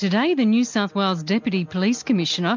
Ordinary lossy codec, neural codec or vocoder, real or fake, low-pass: MP3, 64 kbps; none; real; 7.2 kHz